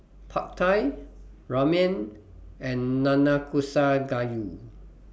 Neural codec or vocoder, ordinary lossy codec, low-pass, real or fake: none; none; none; real